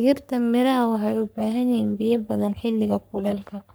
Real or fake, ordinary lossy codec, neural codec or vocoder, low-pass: fake; none; codec, 44.1 kHz, 3.4 kbps, Pupu-Codec; none